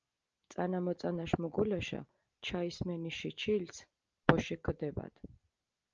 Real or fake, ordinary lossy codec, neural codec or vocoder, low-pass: real; Opus, 24 kbps; none; 7.2 kHz